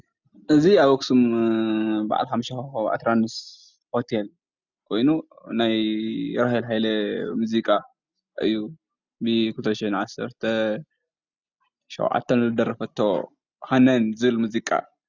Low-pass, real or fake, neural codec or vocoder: 7.2 kHz; real; none